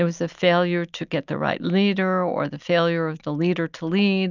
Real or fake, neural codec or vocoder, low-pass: real; none; 7.2 kHz